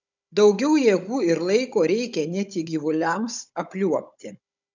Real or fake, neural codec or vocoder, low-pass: fake; codec, 16 kHz, 16 kbps, FunCodec, trained on Chinese and English, 50 frames a second; 7.2 kHz